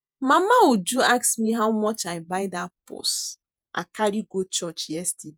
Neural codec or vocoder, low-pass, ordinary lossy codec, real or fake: vocoder, 48 kHz, 128 mel bands, Vocos; none; none; fake